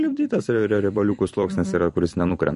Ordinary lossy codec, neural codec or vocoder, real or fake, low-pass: MP3, 48 kbps; vocoder, 44.1 kHz, 128 mel bands every 256 samples, BigVGAN v2; fake; 14.4 kHz